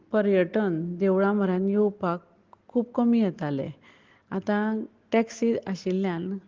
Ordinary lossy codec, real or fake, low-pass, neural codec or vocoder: Opus, 16 kbps; real; 7.2 kHz; none